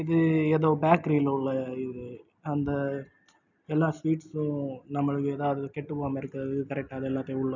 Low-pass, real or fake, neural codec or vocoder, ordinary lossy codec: 7.2 kHz; real; none; none